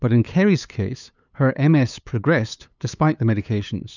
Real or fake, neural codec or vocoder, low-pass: fake; codec, 16 kHz, 8 kbps, FunCodec, trained on LibriTTS, 25 frames a second; 7.2 kHz